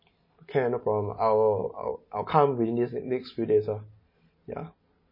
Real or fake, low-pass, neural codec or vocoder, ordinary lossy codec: real; 5.4 kHz; none; MP3, 24 kbps